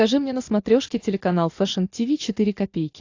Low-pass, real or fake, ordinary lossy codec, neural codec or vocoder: 7.2 kHz; real; AAC, 48 kbps; none